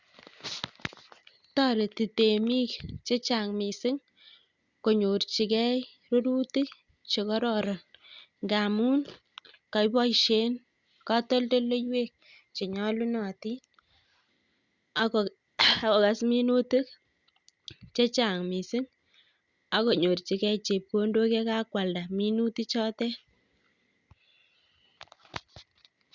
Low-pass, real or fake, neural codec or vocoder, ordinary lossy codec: 7.2 kHz; real; none; Opus, 64 kbps